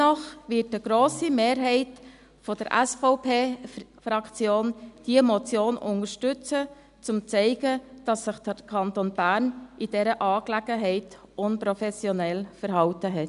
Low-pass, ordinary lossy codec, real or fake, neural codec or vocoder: 10.8 kHz; none; real; none